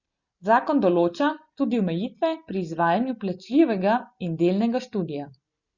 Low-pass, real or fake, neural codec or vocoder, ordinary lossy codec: 7.2 kHz; real; none; Opus, 64 kbps